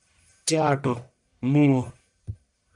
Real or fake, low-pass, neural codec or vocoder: fake; 10.8 kHz; codec, 44.1 kHz, 1.7 kbps, Pupu-Codec